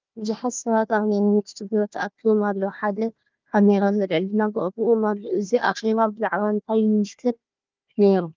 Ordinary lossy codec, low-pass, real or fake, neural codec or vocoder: Opus, 24 kbps; 7.2 kHz; fake; codec, 16 kHz, 1 kbps, FunCodec, trained on Chinese and English, 50 frames a second